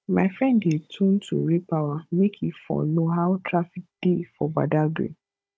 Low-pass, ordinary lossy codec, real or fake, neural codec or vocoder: none; none; fake; codec, 16 kHz, 16 kbps, FunCodec, trained on Chinese and English, 50 frames a second